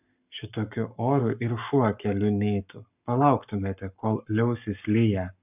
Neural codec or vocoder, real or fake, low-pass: codec, 44.1 kHz, 7.8 kbps, Pupu-Codec; fake; 3.6 kHz